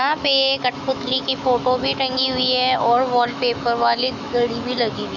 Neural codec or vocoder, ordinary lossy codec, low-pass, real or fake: autoencoder, 48 kHz, 128 numbers a frame, DAC-VAE, trained on Japanese speech; none; 7.2 kHz; fake